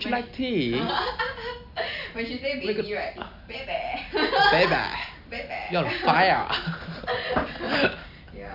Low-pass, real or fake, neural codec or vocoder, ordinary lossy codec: 5.4 kHz; real; none; none